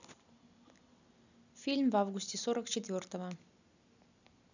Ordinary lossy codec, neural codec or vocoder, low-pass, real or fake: none; none; 7.2 kHz; real